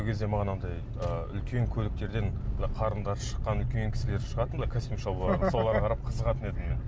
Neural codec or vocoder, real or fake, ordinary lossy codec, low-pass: none; real; none; none